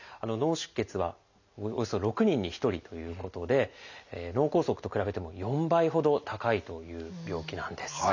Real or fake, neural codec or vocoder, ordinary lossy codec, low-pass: real; none; none; 7.2 kHz